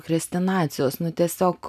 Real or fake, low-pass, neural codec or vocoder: real; 14.4 kHz; none